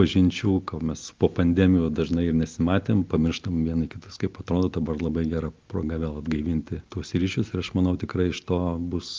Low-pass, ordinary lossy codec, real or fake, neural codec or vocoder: 7.2 kHz; Opus, 24 kbps; real; none